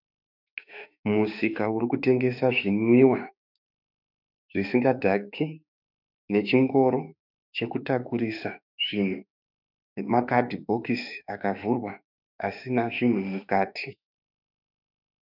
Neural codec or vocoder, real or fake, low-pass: autoencoder, 48 kHz, 32 numbers a frame, DAC-VAE, trained on Japanese speech; fake; 5.4 kHz